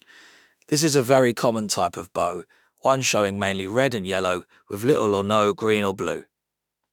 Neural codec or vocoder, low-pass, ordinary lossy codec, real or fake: autoencoder, 48 kHz, 32 numbers a frame, DAC-VAE, trained on Japanese speech; 19.8 kHz; none; fake